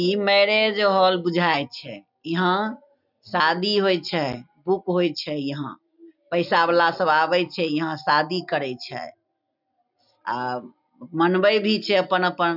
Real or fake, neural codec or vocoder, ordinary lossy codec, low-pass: real; none; none; 5.4 kHz